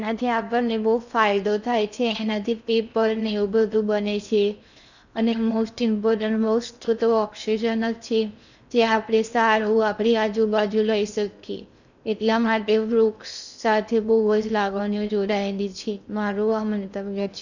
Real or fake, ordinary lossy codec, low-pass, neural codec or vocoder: fake; none; 7.2 kHz; codec, 16 kHz in and 24 kHz out, 0.6 kbps, FocalCodec, streaming, 2048 codes